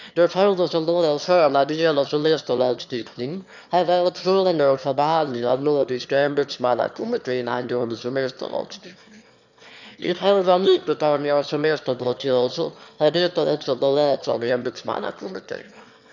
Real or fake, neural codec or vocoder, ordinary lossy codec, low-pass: fake; autoencoder, 22.05 kHz, a latent of 192 numbers a frame, VITS, trained on one speaker; none; 7.2 kHz